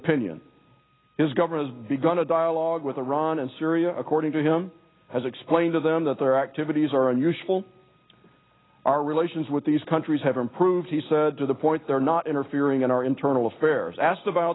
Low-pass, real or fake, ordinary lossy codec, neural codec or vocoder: 7.2 kHz; real; AAC, 16 kbps; none